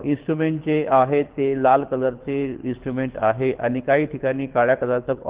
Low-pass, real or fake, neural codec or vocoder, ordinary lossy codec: 3.6 kHz; fake; codec, 24 kHz, 6 kbps, HILCodec; Opus, 16 kbps